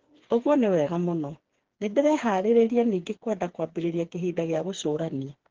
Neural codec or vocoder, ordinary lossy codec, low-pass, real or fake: codec, 16 kHz, 4 kbps, FreqCodec, smaller model; Opus, 16 kbps; 7.2 kHz; fake